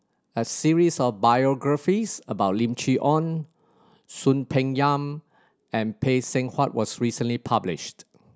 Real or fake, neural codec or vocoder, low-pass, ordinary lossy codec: real; none; none; none